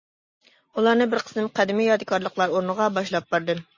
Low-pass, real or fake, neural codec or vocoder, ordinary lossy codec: 7.2 kHz; real; none; MP3, 32 kbps